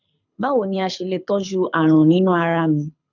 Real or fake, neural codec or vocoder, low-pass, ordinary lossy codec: fake; codec, 24 kHz, 6 kbps, HILCodec; 7.2 kHz; none